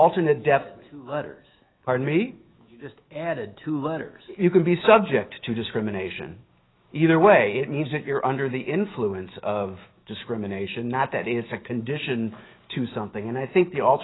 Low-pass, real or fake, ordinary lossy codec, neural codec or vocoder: 7.2 kHz; fake; AAC, 16 kbps; autoencoder, 48 kHz, 128 numbers a frame, DAC-VAE, trained on Japanese speech